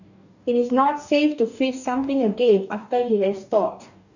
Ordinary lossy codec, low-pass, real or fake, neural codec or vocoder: none; 7.2 kHz; fake; codec, 44.1 kHz, 2.6 kbps, DAC